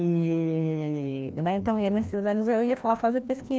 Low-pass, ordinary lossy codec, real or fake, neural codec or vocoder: none; none; fake; codec, 16 kHz, 1 kbps, FreqCodec, larger model